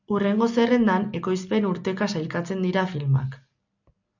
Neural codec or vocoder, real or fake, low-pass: none; real; 7.2 kHz